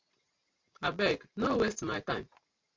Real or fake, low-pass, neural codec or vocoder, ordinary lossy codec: real; 7.2 kHz; none; MP3, 48 kbps